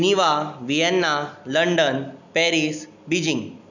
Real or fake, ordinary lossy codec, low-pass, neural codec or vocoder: real; none; 7.2 kHz; none